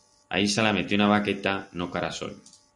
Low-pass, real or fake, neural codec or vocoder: 10.8 kHz; real; none